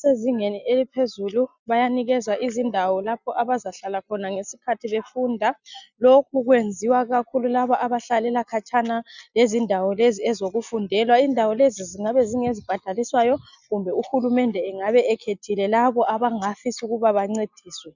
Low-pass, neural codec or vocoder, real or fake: 7.2 kHz; none; real